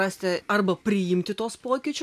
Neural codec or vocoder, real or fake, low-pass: none; real; 14.4 kHz